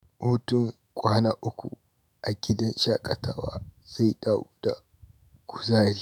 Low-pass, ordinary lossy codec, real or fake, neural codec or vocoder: none; none; fake; autoencoder, 48 kHz, 128 numbers a frame, DAC-VAE, trained on Japanese speech